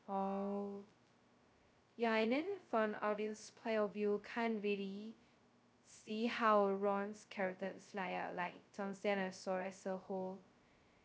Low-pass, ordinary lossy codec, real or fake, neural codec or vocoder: none; none; fake; codec, 16 kHz, 0.2 kbps, FocalCodec